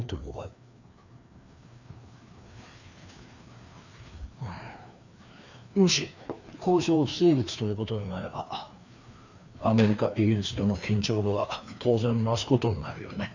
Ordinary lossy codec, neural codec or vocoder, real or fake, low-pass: none; codec, 16 kHz, 2 kbps, FreqCodec, larger model; fake; 7.2 kHz